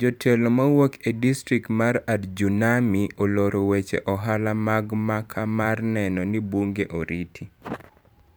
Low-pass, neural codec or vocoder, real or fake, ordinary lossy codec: none; vocoder, 44.1 kHz, 128 mel bands every 512 samples, BigVGAN v2; fake; none